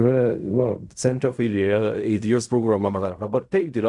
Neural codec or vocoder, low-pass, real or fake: codec, 16 kHz in and 24 kHz out, 0.4 kbps, LongCat-Audio-Codec, fine tuned four codebook decoder; 10.8 kHz; fake